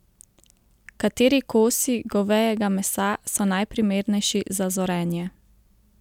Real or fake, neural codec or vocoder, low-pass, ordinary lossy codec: real; none; 19.8 kHz; none